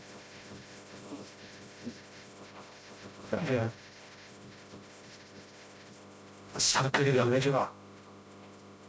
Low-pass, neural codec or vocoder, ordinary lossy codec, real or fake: none; codec, 16 kHz, 0.5 kbps, FreqCodec, smaller model; none; fake